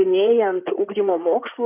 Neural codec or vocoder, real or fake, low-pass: codec, 16 kHz in and 24 kHz out, 2.2 kbps, FireRedTTS-2 codec; fake; 3.6 kHz